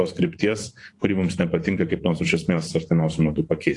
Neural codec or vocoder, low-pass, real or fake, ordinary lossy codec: vocoder, 24 kHz, 100 mel bands, Vocos; 10.8 kHz; fake; AAC, 48 kbps